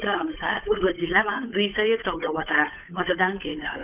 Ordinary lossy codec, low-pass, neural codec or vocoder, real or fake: none; 3.6 kHz; codec, 16 kHz, 8 kbps, FunCodec, trained on Chinese and English, 25 frames a second; fake